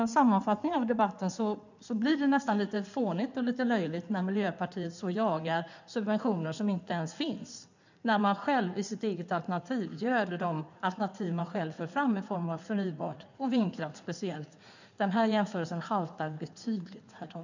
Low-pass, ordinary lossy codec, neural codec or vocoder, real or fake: 7.2 kHz; none; codec, 16 kHz in and 24 kHz out, 2.2 kbps, FireRedTTS-2 codec; fake